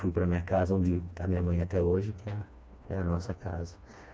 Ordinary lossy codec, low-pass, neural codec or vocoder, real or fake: none; none; codec, 16 kHz, 2 kbps, FreqCodec, smaller model; fake